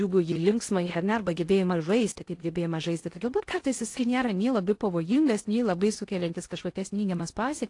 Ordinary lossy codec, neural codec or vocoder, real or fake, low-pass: AAC, 48 kbps; codec, 16 kHz in and 24 kHz out, 0.6 kbps, FocalCodec, streaming, 2048 codes; fake; 10.8 kHz